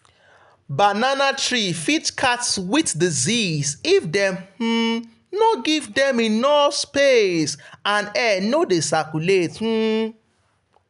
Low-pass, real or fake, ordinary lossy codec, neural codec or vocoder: 10.8 kHz; real; none; none